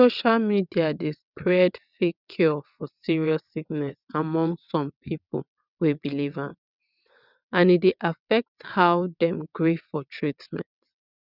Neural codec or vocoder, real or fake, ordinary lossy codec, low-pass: vocoder, 22.05 kHz, 80 mel bands, WaveNeXt; fake; none; 5.4 kHz